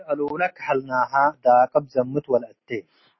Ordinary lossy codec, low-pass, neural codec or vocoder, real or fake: MP3, 24 kbps; 7.2 kHz; none; real